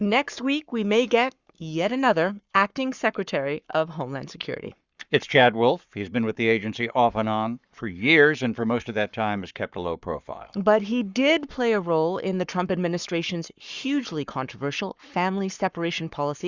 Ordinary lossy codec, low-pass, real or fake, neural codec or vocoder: Opus, 64 kbps; 7.2 kHz; fake; codec, 44.1 kHz, 7.8 kbps, Pupu-Codec